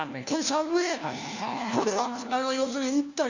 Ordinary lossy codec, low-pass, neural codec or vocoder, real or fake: none; 7.2 kHz; codec, 16 kHz, 1 kbps, FunCodec, trained on LibriTTS, 50 frames a second; fake